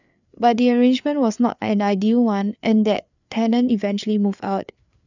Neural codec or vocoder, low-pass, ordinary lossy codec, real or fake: codec, 16 kHz, 4 kbps, FreqCodec, larger model; 7.2 kHz; none; fake